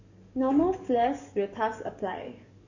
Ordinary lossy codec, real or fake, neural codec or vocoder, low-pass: Opus, 64 kbps; fake; codec, 44.1 kHz, 7.8 kbps, DAC; 7.2 kHz